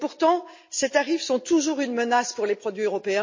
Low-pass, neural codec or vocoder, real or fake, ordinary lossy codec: 7.2 kHz; none; real; MP3, 32 kbps